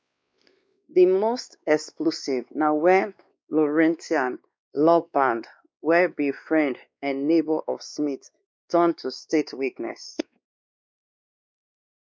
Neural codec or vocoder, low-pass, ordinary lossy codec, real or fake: codec, 16 kHz, 2 kbps, X-Codec, WavLM features, trained on Multilingual LibriSpeech; none; none; fake